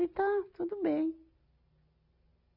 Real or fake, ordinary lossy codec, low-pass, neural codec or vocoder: real; none; 5.4 kHz; none